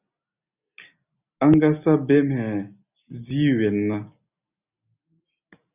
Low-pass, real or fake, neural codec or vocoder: 3.6 kHz; real; none